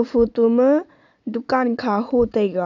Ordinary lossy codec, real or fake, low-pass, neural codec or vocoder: none; fake; 7.2 kHz; vocoder, 44.1 kHz, 80 mel bands, Vocos